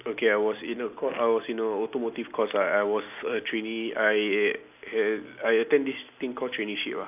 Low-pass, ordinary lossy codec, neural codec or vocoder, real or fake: 3.6 kHz; none; none; real